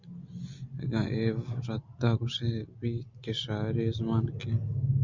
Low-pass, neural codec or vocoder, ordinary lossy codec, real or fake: 7.2 kHz; none; Opus, 64 kbps; real